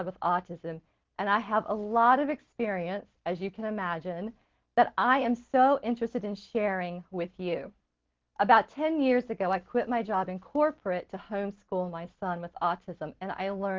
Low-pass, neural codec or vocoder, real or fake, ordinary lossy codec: 7.2 kHz; none; real; Opus, 16 kbps